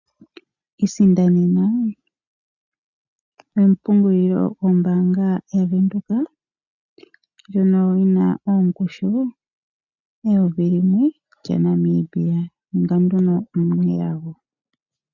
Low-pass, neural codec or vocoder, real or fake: 7.2 kHz; none; real